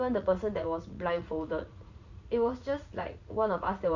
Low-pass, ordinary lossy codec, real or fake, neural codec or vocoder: 7.2 kHz; none; fake; vocoder, 44.1 kHz, 80 mel bands, Vocos